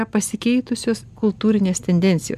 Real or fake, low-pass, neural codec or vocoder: real; 14.4 kHz; none